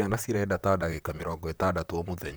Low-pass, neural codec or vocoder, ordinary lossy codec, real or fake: none; vocoder, 44.1 kHz, 128 mel bands, Pupu-Vocoder; none; fake